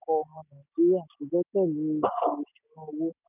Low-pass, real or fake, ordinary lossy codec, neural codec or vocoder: 3.6 kHz; real; none; none